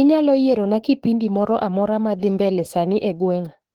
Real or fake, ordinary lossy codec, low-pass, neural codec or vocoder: fake; Opus, 16 kbps; 19.8 kHz; autoencoder, 48 kHz, 32 numbers a frame, DAC-VAE, trained on Japanese speech